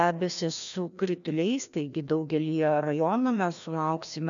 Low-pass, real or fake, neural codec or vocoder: 7.2 kHz; fake; codec, 16 kHz, 1 kbps, FreqCodec, larger model